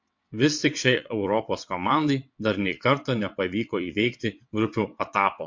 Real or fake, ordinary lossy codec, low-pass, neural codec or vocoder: fake; MP3, 48 kbps; 7.2 kHz; vocoder, 22.05 kHz, 80 mel bands, WaveNeXt